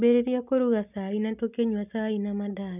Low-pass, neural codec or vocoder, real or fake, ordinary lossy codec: 3.6 kHz; none; real; none